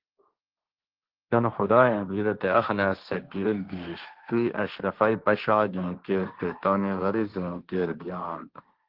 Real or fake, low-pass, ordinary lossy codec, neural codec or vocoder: fake; 5.4 kHz; Opus, 16 kbps; codec, 16 kHz, 1.1 kbps, Voila-Tokenizer